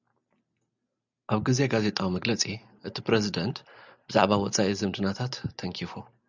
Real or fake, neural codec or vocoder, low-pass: real; none; 7.2 kHz